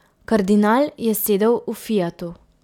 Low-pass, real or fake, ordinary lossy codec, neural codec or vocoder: 19.8 kHz; real; none; none